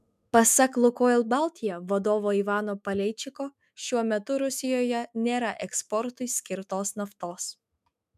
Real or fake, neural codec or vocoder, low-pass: fake; autoencoder, 48 kHz, 128 numbers a frame, DAC-VAE, trained on Japanese speech; 14.4 kHz